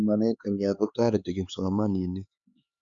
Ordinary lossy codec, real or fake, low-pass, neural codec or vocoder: Opus, 64 kbps; fake; 7.2 kHz; codec, 16 kHz, 4 kbps, X-Codec, HuBERT features, trained on LibriSpeech